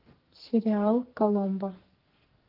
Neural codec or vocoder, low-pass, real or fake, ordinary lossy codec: codec, 44.1 kHz, 2.6 kbps, SNAC; 5.4 kHz; fake; Opus, 16 kbps